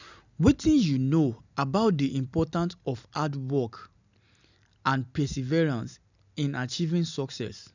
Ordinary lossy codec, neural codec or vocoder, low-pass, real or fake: none; none; 7.2 kHz; real